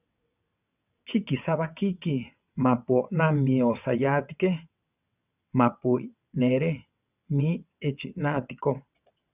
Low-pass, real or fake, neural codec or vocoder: 3.6 kHz; fake; vocoder, 22.05 kHz, 80 mel bands, WaveNeXt